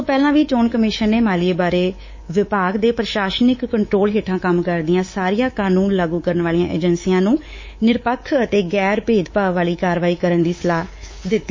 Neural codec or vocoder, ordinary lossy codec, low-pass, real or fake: codec, 24 kHz, 3.1 kbps, DualCodec; MP3, 32 kbps; 7.2 kHz; fake